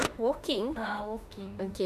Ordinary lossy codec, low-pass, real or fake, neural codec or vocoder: none; 14.4 kHz; fake; autoencoder, 48 kHz, 32 numbers a frame, DAC-VAE, trained on Japanese speech